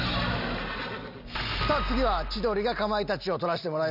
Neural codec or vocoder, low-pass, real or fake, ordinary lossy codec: none; 5.4 kHz; real; none